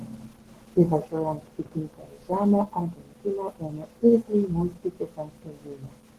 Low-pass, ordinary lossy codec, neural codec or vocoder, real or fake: 14.4 kHz; Opus, 16 kbps; codec, 44.1 kHz, 7.8 kbps, Pupu-Codec; fake